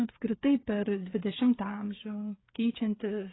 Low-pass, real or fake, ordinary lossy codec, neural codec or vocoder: 7.2 kHz; fake; AAC, 16 kbps; codec, 16 kHz, 8 kbps, FreqCodec, smaller model